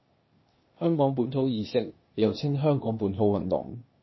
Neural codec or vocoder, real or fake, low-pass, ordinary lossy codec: codec, 16 kHz, 0.8 kbps, ZipCodec; fake; 7.2 kHz; MP3, 24 kbps